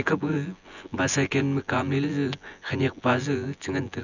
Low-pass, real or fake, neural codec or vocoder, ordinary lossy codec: 7.2 kHz; fake; vocoder, 24 kHz, 100 mel bands, Vocos; none